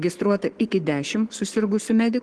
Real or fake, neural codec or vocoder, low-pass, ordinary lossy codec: fake; codec, 44.1 kHz, 7.8 kbps, Pupu-Codec; 10.8 kHz; Opus, 16 kbps